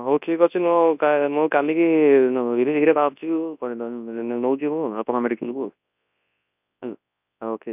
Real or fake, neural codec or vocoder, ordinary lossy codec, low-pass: fake; codec, 24 kHz, 0.9 kbps, WavTokenizer, large speech release; none; 3.6 kHz